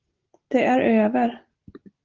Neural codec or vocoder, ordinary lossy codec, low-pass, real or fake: none; Opus, 16 kbps; 7.2 kHz; real